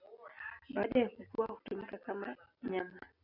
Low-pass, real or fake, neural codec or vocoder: 5.4 kHz; real; none